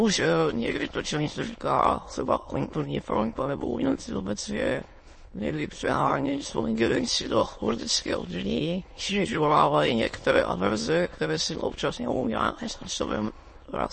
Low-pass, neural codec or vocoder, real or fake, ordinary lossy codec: 9.9 kHz; autoencoder, 22.05 kHz, a latent of 192 numbers a frame, VITS, trained on many speakers; fake; MP3, 32 kbps